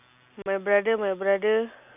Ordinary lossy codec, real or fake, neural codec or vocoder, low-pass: none; real; none; 3.6 kHz